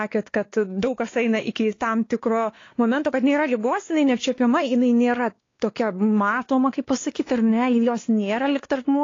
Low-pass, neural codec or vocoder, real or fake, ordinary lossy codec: 7.2 kHz; codec, 16 kHz, 2 kbps, X-Codec, WavLM features, trained on Multilingual LibriSpeech; fake; AAC, 32 kbps